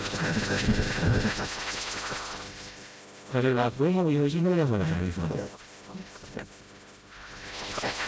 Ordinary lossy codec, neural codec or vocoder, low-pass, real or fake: none; codec, 16 kHz, 0.5 kbps, FreqCodec, smaller model; none; fake